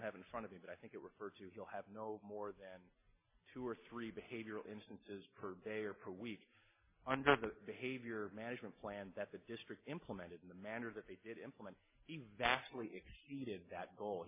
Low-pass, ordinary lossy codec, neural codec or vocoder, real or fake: 3.6 kHz; MP3, 24 kbps; none; real